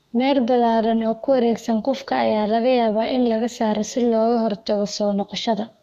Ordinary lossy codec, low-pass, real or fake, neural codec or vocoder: none; 14.4 kHz; fake; codec, 32 kHz, 1.9 kbps, SNAC